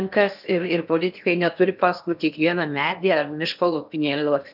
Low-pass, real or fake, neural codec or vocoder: 5.4 kHz; fake; codec, 16 kHz in and 24 kHz out, 0.8 kbps, FocalCodec, streaming, 65536 codes